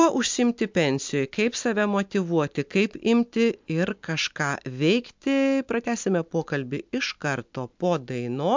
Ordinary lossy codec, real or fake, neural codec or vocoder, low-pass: MP3, 64 kbps; real; none; 7.2 kHz